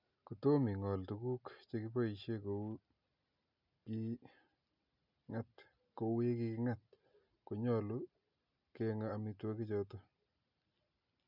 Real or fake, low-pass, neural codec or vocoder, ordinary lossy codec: real; 5.4 kHz; none; none